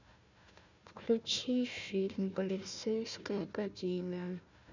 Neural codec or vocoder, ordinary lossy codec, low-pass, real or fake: codec, 16 kHz, 1 kbps, FunCodec, trained on Chinese and English, 50 frames a second; none; 7.2 kHz; fake